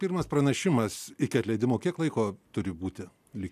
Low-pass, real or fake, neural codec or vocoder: 14.4 kHz; real; none